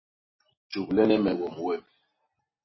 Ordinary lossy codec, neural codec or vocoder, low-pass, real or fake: MP3, 24 kbps; none; 7.2 kHz; real